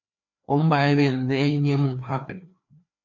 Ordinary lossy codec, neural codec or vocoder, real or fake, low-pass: MP3, 48 kbps; codec, 16 kHz, 2 kbps, FreqCodec, larger model; fake; 7.2 kHz